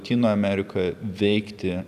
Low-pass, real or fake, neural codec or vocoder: 14.4 kHz; fake; vocoder, 44.1 kHz, 128 mel bands every 256 samples, BigVGAN v2